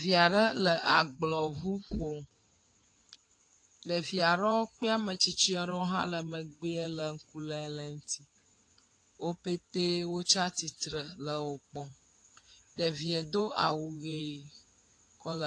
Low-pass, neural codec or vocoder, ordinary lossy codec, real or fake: 9.9 kHz; codec, 16 kHz in and 24 kHz out, 2.2 kbps, FireRedTTS-2 codec; AAC, 48 kbps; fake